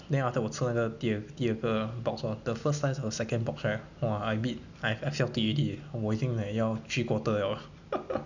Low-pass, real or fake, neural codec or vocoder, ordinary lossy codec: 7.2 kHz; real; none; none